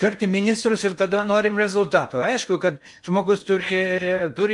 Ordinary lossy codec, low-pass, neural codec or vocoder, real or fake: MP3, 64 kbps; 10.8 kHz; codec, 16 kHz in and 24 kHz out, 0.8 kbps, FocalCodec, streaming, 65536 codes; fake